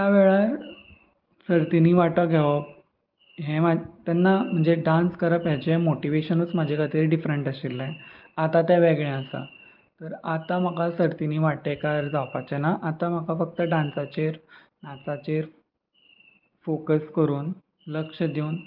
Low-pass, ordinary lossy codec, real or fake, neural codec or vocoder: 5.4 kHz; Opus, 32 kbps; real; none